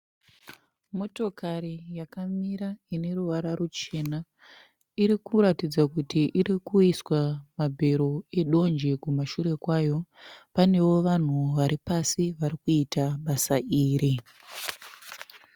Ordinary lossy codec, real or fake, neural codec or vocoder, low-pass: Opus, 64 kbps; real; none; 19.8 kHz